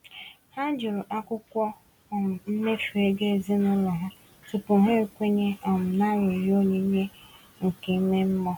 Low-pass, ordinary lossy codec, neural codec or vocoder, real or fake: 19.8 kHz; none; none; real